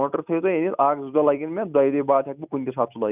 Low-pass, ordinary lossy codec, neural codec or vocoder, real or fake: 3.6 kHz; none; none; real